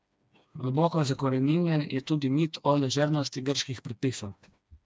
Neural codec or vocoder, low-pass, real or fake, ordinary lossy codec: codec, 16 kHz, 2 kbps, FreqCodec, smaller model; none; fake; none